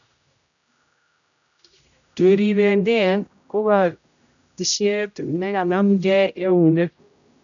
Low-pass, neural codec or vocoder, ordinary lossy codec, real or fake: 7.2 kHz; codec, 16 kHz, 0.5 kbps, X-Codec, HuBERT features, trained on general audio; none; fake